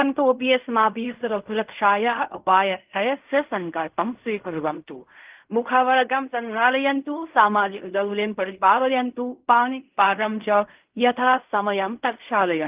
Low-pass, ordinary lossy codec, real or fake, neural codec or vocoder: 3.6 kHz; Opus, 24 kbps; fake; codec, 16 kHz in and 24 kHz out, 0.4 kbps, LongCat-Audio-Codec, fine tuned four codebook decoder